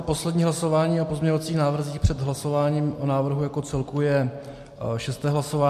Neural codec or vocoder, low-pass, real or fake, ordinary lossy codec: none; 14.4 kHz; real; MP3, 64 kbps